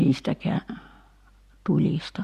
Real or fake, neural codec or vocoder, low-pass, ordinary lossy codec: real; none; 14.4 kHz; Opus, 64 kbps